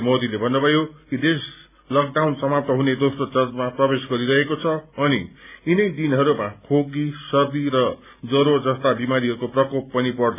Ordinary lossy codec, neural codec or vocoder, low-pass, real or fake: none; none; 3.6 kHz; real